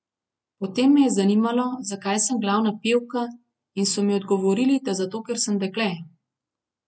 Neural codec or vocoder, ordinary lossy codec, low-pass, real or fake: none; none; none; real